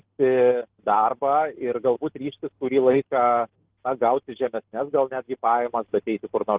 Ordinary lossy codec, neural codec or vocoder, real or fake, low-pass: Opus, 16 kbps; none; real; 3.6 kHz